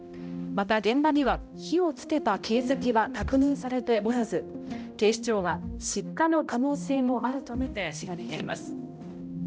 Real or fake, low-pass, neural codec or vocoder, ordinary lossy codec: fake; none; codec, 16 kHz, 0.5 kbps, X-Codec, HuBERT features, trained on balanced general audio; none